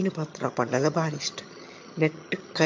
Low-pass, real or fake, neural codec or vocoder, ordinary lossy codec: 7.2 kHz; fake; vocoder, 22.05 kHz, 80 mel bands, HiFi-GAN; MP3, 48 kbps